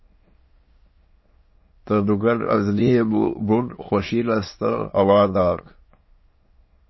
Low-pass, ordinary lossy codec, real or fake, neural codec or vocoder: 7.2 kHz; MP3, 24 kbps; fake; autoencoder, 22.05 kHz, a latent of 192 numbers a frame, VITS, trained on many speakers